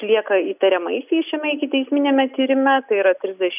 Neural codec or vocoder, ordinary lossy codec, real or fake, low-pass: none; AAC, 32 kbps; real; 3.6 kHz